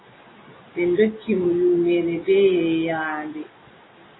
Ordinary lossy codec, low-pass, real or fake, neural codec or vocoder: AAC, 16 kbps; 7.2 kHz; real; none